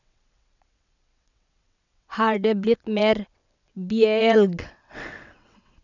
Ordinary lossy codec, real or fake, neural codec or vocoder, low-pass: none; fake; vocoder, 22.05 kHz, 80 mel bands, WaveNeXt; 7.2 kHz